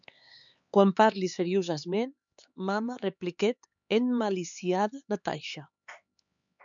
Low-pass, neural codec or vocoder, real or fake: 7.2 kHz; codec, 16 kHz, 4 kbps, X-Codec, HuBERT features, trained on LibriSpeech; fake